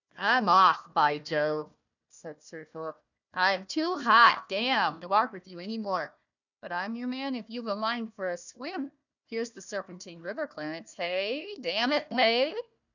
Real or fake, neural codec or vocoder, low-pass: fake; codec, 16 kHz, 1 kbps, FunCodec, trained on Chinese and English, 50 frames a second; 7.2 kHz